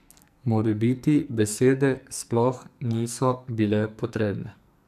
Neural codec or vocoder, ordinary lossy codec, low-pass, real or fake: codec, 44.1 kHz, 2.6 kbps, SNAC; none; 14.4 kHz; fake